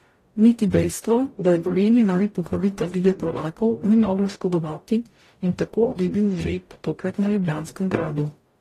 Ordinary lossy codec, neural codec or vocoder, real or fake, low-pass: AAC, 48 kbps; codec, 44.1 kHz, 0.9 kbps, DAC; fake; 14.4 kHz